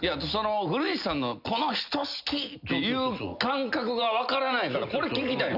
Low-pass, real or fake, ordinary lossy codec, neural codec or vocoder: 5.4 kHz; real; none; none